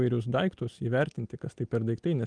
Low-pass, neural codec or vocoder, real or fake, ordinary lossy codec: 9.9 kHz; none; real; Opus, 32 kbps